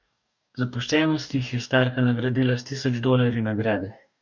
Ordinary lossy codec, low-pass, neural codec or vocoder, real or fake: none; 7.2 kHz; codec, 44.1 kHz, 2.6 kbps, DAC; fake